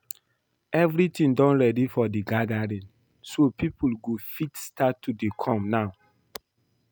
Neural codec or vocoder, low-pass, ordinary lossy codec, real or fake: none; none; none; real